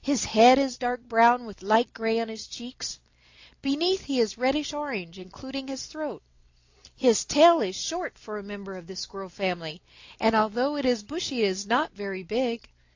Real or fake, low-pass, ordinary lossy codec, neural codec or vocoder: real; 7.2 kHz; AAC, 48 kbps; none